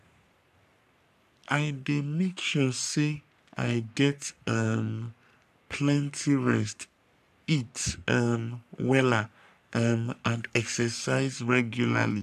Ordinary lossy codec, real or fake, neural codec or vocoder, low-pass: none; fake; codec, 44.1 kHz, 3.4 kbps, Pupu-Codec; 14.4 kHz